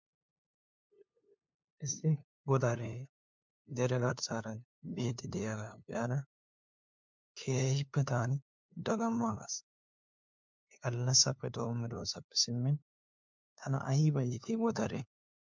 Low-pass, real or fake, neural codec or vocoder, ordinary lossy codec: 7.2 kHz; fake; codec, 16 kHz, 2 kbps, FunCodec, trained on LibriTTS, 25 frames a second; MP3, 64 kbps